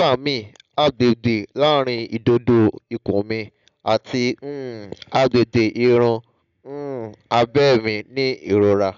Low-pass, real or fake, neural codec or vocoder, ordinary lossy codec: 7.2 kHz; real; none; none